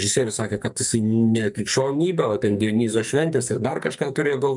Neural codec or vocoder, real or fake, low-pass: codec, 44.1 kHz, 2.6 kbps, SNAC; fake; 10.8 kHz